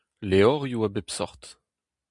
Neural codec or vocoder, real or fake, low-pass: none; real; 10.8 kHz